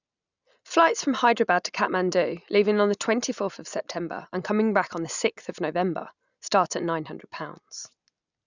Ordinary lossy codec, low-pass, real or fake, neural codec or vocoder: none; 7.2 kHz; real; none